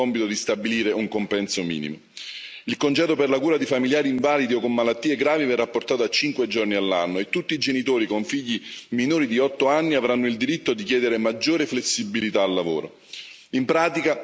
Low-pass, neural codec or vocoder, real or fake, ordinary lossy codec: none; none; real; none